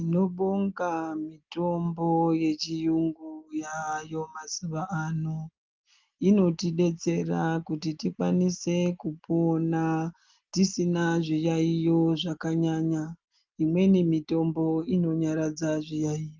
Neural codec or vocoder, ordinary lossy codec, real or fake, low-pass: none; Opus, 32 kbps; real; 7.2 kHz